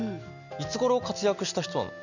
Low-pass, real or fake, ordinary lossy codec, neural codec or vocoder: 7.2 kHz; real; none; none